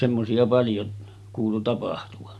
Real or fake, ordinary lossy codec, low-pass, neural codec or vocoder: real; none; none; none